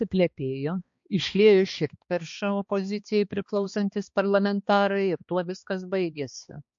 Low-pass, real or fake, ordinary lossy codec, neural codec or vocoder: 7.2 kHz; fake; MP3, 48 kbps; codec, 16 kHz, 2 kbps, X-Codec, HuBERT features, trained on balanced general audio